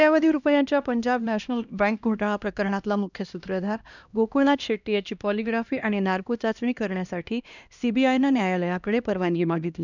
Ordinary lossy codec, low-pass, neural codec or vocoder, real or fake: none; 7.2 kHz; codec, 16 kHz, 1 kbps, X-Codec, HuBERT features, trained on LibriSpeech; fake